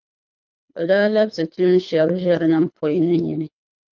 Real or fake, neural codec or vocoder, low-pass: fake; codec, 24 kHz, 3 kbps, HILCodec; 7.2 kHz